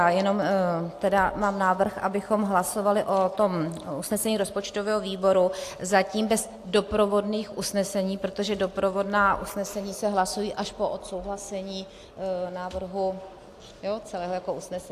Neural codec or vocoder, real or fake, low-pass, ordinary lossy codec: none; real; 14.4 kHz; AAC, 64 kbps